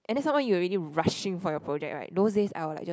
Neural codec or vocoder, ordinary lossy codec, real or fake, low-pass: none; none; real; none